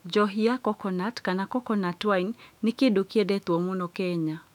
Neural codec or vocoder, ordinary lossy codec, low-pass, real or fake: autoencoder, 48 kHz, 128 numbers a frame, DAC-VAE, trained on Japanese speech; none; 19.8 kHz; fake